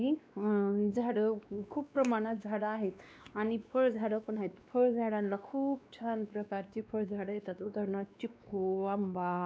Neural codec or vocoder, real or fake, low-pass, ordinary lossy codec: codec, 16 kHz, 2 kbps, X-Codec, WavLM features, trained on Multilingual LibriSpeech; fake; none; none